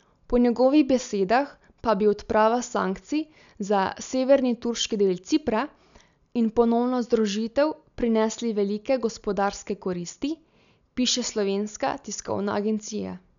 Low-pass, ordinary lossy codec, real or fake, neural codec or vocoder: 7.2 kHz; MP3, 96 kbps; real; none